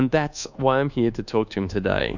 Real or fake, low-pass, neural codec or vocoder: fake; 7.2 kHz; codec, 24 kHz, 1.2 kbps, DualCodec